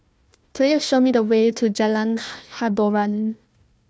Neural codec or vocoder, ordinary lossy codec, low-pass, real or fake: codec, 16 kHz, 1 kbps, FunCodec, trained on Chinese and English, 50 frames a second; none; none; fake